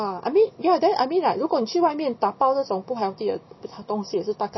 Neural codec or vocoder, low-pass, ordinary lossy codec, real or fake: none; 7.2 kHz; MP3, 24 kbps; real